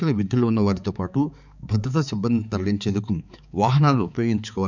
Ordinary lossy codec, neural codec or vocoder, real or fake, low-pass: none; codec, 16 kHz, 4 kbps, X-Codec, HuBERT features, trained on balanced general audio; fake; 7.2 kHz